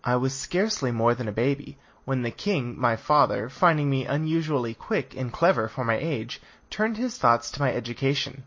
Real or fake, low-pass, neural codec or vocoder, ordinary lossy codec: real; 7.2 kHz; none; MP3, 32 kbps